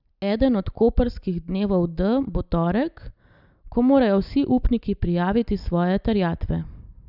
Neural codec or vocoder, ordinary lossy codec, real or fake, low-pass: none; none; real; 5.4 kHz